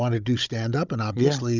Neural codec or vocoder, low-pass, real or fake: codec, 16 kHz, 16 kbps, FunCodec, trained on Chinese and English, 50 frames a second; 7.2 kHz; fake